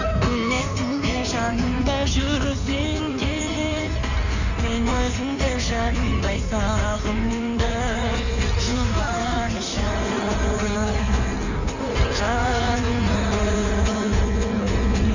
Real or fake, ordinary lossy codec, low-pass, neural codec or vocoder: fake; none; 7.2 kHz; codec, 16 kHz in and 24 kHz out, 1.1 kbps, FireRedTTS-2 codec